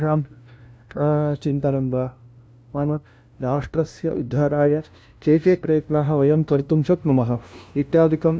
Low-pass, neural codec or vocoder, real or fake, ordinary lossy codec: none; codec, 16 kHz, 0.5 kbps, FunCodec, trained on LibriTTS, 25 frames a second; fake; none